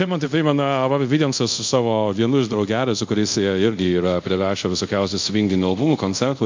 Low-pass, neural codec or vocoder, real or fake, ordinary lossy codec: 7.2 kHz; codec, 24 kHz, 0.5 kbps, DualCodec; fake; MP3, 48 kbps